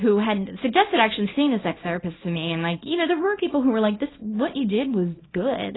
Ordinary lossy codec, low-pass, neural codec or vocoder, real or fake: AAC, 16 kbps; 7.2 kHz; codec, 24 kHz, 0.9 kbps, WavTokenizer, small release; fake